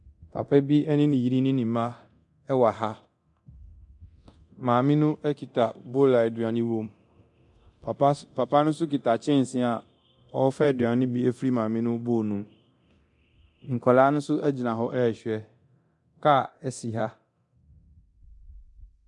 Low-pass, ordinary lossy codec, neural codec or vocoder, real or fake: 10.8 kHz; MP3, 64 kbps; codec, 24 kHz, 0.9 kbps, DualCodec; fake